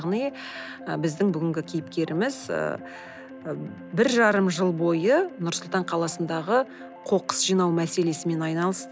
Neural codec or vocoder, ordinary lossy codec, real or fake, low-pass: none; none; real; none